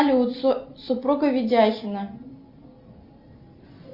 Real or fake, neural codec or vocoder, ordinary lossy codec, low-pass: real; none; Opus, 64 kbps; 5.4 kHz